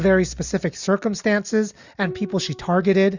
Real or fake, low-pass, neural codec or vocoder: real; 7.2 kHz; none